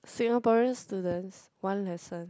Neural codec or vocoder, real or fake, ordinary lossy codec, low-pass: none; real; none; none